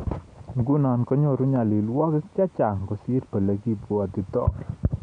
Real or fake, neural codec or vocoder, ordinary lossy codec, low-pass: real; none; none; 9.9 kHz